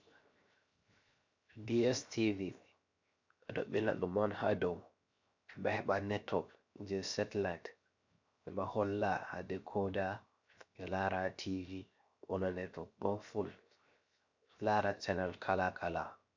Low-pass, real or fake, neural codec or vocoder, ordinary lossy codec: 7.2 kHz; fake; codec, 16 kHz, 0.7 kbps, FocalCodec; MP3, 64 kbps